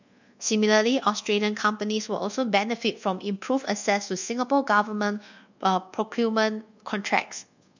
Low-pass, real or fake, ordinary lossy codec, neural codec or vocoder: 7.2 kHz; fake; none; codec, 24 kHz, 1.2 kbps, DualCodec